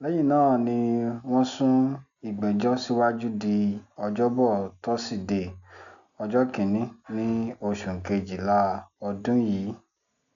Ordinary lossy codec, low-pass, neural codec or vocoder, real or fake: none; 7.2 kHz; none; real